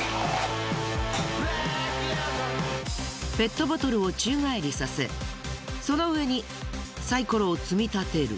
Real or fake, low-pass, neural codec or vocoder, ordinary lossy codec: real; none; none; none